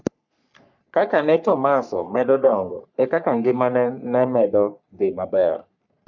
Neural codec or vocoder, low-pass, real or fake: codec, 44.1 kHz, 3.4 kbps, Pupu-Codec; 7.2 kHz; fake